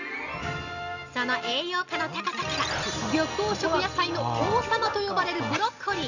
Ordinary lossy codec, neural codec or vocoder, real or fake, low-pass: none; none; real; 7.2 kHz